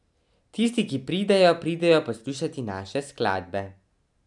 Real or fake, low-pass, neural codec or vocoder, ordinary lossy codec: real; 10.8 kHz; none; none